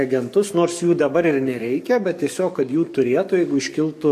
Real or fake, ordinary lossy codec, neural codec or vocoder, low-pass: fake; MP3, 64 kbps; codec, 44.1 kHz, 7.8 kbps, DAC; 14.4 kHz